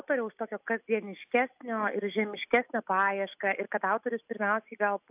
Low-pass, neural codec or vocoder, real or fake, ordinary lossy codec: 3.6 kHz; none; real; AAC, 32 kbps